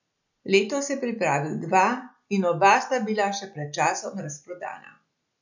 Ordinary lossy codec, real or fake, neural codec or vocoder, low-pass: none; real; none; 7.2 kHz